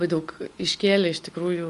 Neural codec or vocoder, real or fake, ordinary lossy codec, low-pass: none; real; Opus, 32 kbps; 10.8 kHz